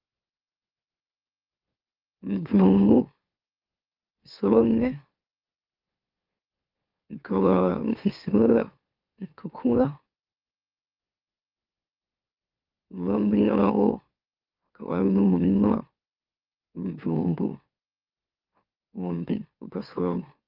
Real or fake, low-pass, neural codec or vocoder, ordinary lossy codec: fake; 5.4 kHz; autoencoder, 44.1 kHz, a latent of 192 numbers a frame, MeloTTS; Opus, 24 kbps